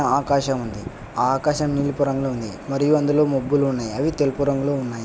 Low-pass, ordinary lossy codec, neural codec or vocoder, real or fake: none; none; none; real